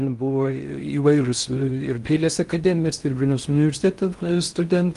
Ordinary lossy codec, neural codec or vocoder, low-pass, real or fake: Opus, 32 kbps; codec, 16 kHz in and 24 kHz out, 0.6 kbps, FocalCodec, streaming, 4096 codes; 10.8 kHz; fake